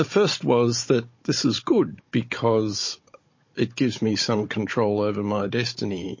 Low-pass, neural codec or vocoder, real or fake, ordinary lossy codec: 7.2 kHz; codec, 16 kHz, 16 kbps, FunCodec, trained on Chinese and English, 50 frames a second; fake; MP3, 32 kbps